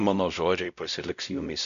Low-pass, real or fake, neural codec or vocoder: 7.2 kHz; fake; codec, 16 kHz, 0.5 kbps, X-Codec, WavLM features, trained on Multilingual LibriSpeech